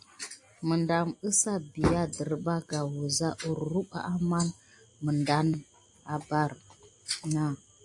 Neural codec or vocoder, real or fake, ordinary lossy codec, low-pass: none; real; AAC, 64 kbps; 10.8 kHz